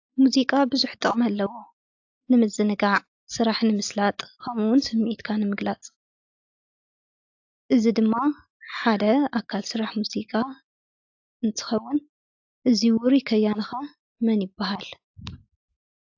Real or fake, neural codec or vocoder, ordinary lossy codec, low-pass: real; none; AAC, 48 kbps; 7.2 kHz